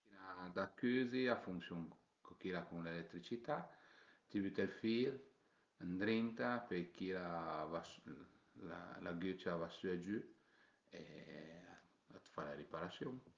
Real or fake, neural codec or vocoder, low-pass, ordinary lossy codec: real; none; 7.2 kHz; Opus, 32 kbps